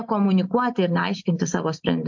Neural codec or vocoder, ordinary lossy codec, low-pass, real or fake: none; MP3, 48 kbps; 7.2 kHz; real